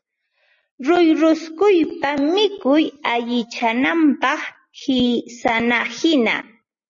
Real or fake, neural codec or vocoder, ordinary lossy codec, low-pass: real; none; MP3, 32 kbps; 7.2 kHz